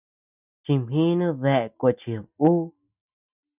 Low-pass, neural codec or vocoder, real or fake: 3.6 kHz; none; real